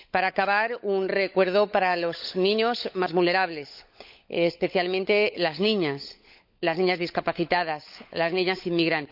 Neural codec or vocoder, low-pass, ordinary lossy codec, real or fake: codec, 16 kHz, 16 kbps, FunCodec, trained on LibriTTS, 50 frames a second; 5.4 kHz; none; fake